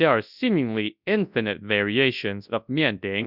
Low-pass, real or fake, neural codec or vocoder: 5.4 kHz; fake; codec, 24 kHz, 0.9 kbps, WavTokenizer, large speech release